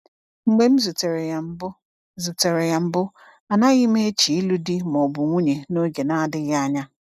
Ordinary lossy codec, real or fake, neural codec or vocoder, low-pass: none; real; none; 14.4 kHz